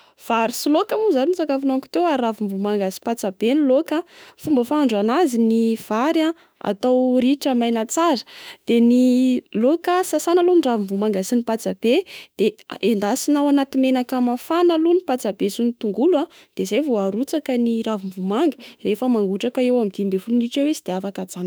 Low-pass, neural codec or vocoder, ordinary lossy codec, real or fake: none; autoencoder, 48 kHz, 32 numbers a frame, DAC-VAE, trained on Japanese speech; none; fake